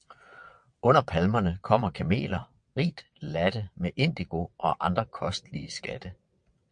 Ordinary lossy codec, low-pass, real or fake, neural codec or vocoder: MP3, 64 kbps; 9.9 kHz; fake; vocoder, 22.05 kHz, 80 mel bands, WaveNeXt